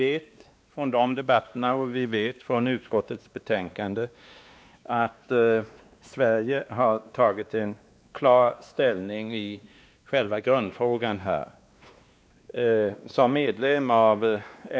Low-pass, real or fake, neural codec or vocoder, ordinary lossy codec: none; fake; codec, 16 kHz, 2 kbps, X-Codec, WavLM features, trained on Multilingual LibriSpeech; none